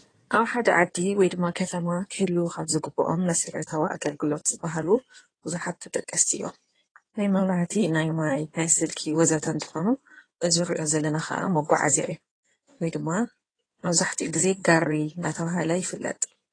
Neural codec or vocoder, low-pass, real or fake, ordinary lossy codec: codec, 16 kHz in and 24 kHz out, 2.2 kbps, FireRedTTS-2 codec; 9.9 kHz; fake; AAC, 32 kbps